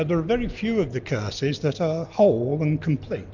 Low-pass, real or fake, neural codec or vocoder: 7.2 kHz; real; none